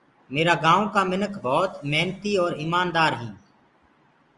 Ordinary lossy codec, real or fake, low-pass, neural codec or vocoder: Opus, 32 kbps; real; 10.8 kHz; none